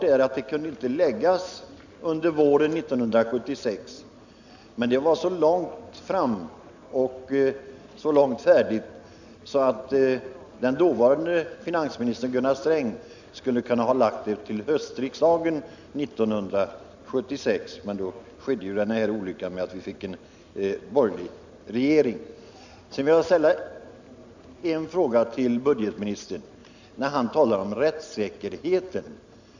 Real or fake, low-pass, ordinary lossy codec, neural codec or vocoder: real; 7.2 kHz; none; none